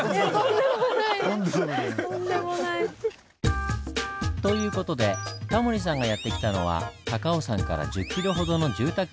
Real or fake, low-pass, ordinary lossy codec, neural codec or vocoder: real; none; none; none